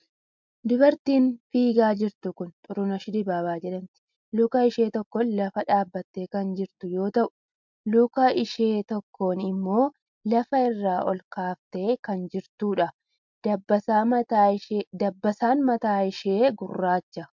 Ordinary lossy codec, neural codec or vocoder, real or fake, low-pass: MP3, 64 kbps; none; real; 7.2 kHz